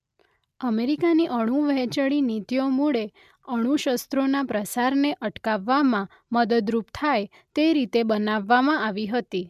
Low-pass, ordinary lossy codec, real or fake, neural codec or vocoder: 14.4 kHz; MP3, 96 kbps; real; none